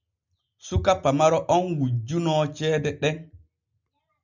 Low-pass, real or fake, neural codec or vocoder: 7.2 kHz; real; none